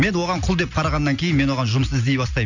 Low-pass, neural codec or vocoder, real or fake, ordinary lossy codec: 7.2 kHz; none; real; none